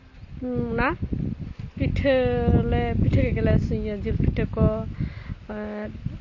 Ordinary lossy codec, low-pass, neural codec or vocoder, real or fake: MP3, 32 kbps; 7.2 kHz; none; real